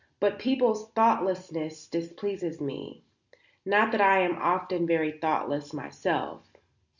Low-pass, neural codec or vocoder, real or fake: 7.2 kHz; none; real